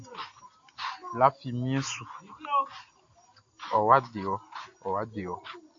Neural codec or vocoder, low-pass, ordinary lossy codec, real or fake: none; 7.2 kHz; AAC, 48 kbps; real